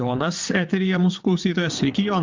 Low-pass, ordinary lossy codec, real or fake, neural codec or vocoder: 7.2 kHz; MP3, 64 kbps; fake; vocoder, 22.05 kHz, 80 mel bands, WaveNeXt